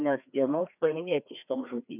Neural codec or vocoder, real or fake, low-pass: codec, 16 kHz, 2 kbps, FreqCodec, larger model; fake; 3.6 kHz